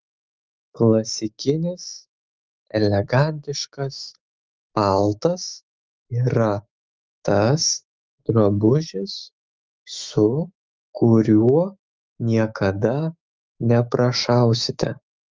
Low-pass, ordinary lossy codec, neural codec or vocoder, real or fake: 7.2 kHz; Opus, 24 kbps; autoencoder, 48 kHz, 128 numbers a frame, DAC-VAE, trained on Japanese speech; fake